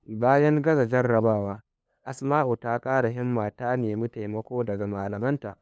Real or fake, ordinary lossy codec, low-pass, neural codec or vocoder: fake; none; none; codec, 16 kHz, 2 kbps, FunCodec, trained on LibriTTS, 25 frames a second